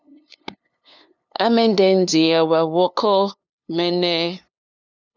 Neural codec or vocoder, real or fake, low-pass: codec, 16 kHz, 2 kbps, FunCodec, trained on LibriTTS, 25 frames a second; fake; 7.2 kHz